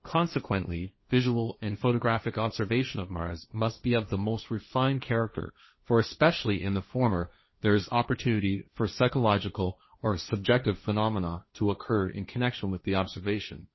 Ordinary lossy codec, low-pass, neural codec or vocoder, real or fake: MP3, 24 kbps; 7.2 kHz; codec, 16 kHz, 1.1 kbps, Voila-Tokenizer; fake